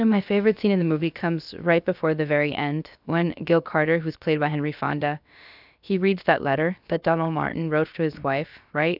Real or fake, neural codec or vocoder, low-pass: fake; codec, 16 kHz, about 1 kbps, DyCAST, with the encoder's durations; 5.4 kHz